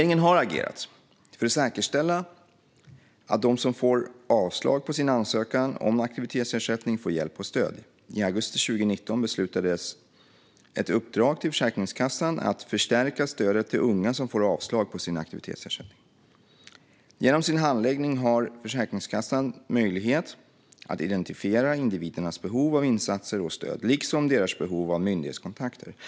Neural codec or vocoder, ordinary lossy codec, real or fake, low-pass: none; none; real; none